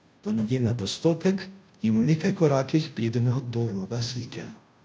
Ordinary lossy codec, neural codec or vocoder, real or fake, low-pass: none; codec, 16 kHz, 0.5 kbps, FunCodec, trained on Chinese and English, 25 frames a second; fake; none